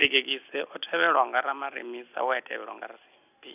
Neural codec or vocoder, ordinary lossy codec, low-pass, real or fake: none; none; 3.6 kHz; real